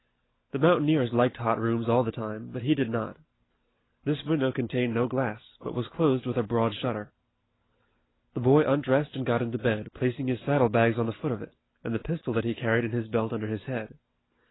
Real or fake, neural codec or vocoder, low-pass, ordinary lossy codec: real; none; 7.2 kHz; AAC, 16 kbps